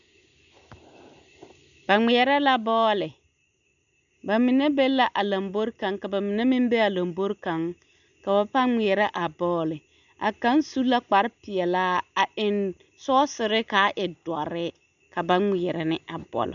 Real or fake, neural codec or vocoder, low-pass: real; none; 7.2 kHz